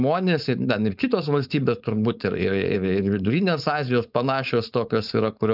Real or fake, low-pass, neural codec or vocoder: fake; 5.4 kHz; codec, 16 kHz, 4.8 kbps, FACodec